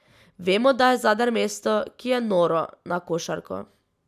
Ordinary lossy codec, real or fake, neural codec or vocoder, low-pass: none; real; none; 14.4 kHz